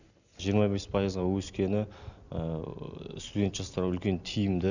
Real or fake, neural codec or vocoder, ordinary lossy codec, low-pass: real; none; none; 7.2 kHz